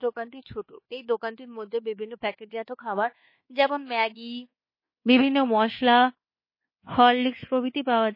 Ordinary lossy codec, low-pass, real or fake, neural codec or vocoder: MP3, 24 kbps; 5.4 kHz; fake; codec, 24 kHz, 1.2 kbps, DualCodec